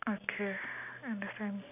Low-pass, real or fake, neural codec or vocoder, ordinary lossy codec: 3.6 kHz; real; none; none